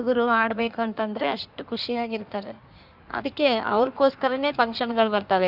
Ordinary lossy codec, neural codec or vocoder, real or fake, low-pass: none; codec, 16 kHz in and 24 kHz out, 1.1 kbps, FireRedTTS-2 codec; fake; 5.4 kHz